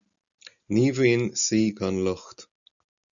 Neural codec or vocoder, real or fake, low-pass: none; real; 7.2 kHz